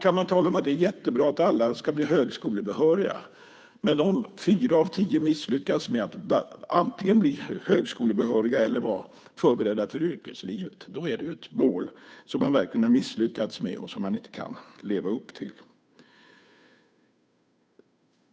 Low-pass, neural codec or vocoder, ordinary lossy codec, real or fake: none; codec, 16 kHz, 2 kbps, FunCodec, trained on Chinese and English, 25 frames a second; none; fake